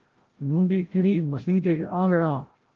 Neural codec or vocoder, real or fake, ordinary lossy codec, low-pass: codec, 16 kHz, 0.5 kbps, FreqCodec, larger model; fake; Opus, 16 kbps; 7.2 kHz